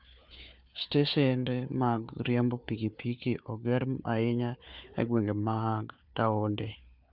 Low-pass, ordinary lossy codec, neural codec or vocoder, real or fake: 5.4 kHz; none; codec, 16 kHz, 4 kbps, FunCodec, trained on Chinese and English, 50 frames a second; fake